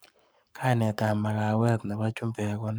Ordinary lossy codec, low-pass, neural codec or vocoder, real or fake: none; none; codec, 44.1 kHz, 7.8 kbps, Pupu-Codec; fake